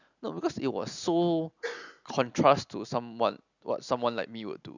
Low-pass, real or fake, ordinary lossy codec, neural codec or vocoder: 7.2 kHz; real; none; none